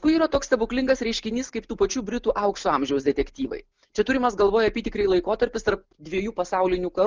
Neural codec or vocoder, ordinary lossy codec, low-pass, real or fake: none; Opus, 32 kbps; 7.2 kHz; real